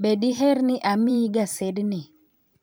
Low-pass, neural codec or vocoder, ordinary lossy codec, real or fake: none; vocoder, 44.1 kHz, 128 mel bands every 512 samples, BigVGAN v2; none; fake